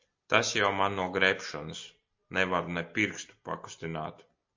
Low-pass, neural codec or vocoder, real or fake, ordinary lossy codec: 7.2 kHz; none; real; MP3, 48 kbps